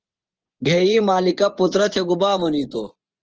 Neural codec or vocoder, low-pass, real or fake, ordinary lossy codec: vocoder, 24 kHz, 100 mel bands, Vocos; 7.2 kHz; fake; Opus, 16 kbps